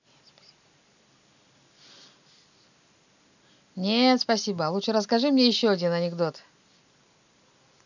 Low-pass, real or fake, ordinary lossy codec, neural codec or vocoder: 7.2 kHz; real; none; none